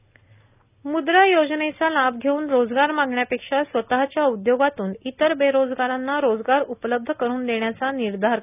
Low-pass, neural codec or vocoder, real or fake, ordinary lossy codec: 3.6 kHz; none; real; none